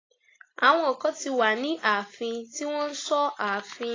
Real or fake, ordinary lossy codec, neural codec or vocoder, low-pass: real; AAC, 32 kbps; none; 7.2 kHz